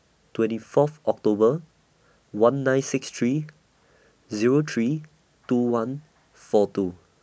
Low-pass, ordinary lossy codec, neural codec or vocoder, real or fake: none; none; none; real